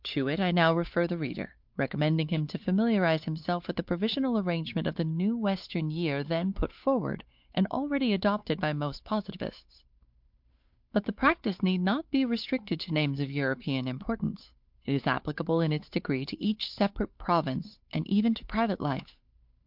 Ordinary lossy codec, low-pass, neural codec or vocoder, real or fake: MP3, 48 kbps; 5.4 kHz; codec, 16 kHz, 8 kbps, FunCodec, trained on Chinese and English, 25 frames a second; fake